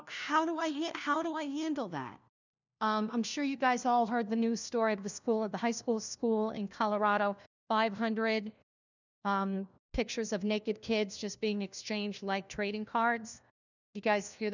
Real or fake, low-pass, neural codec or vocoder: fake; 7.2 kHz; codec, 16 kHz, 1 kbps, FunCodec, trained on LibriTTS, 50 frames a second